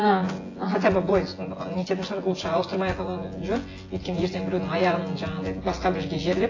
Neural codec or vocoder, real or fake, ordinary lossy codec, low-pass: vocoder, 24 kHz, 100 mel bands, Vocos; fake; AAC, 32 kbps; 7.2 kHz